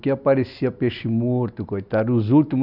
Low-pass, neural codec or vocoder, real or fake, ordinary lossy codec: 5.4 kHz; none; real; none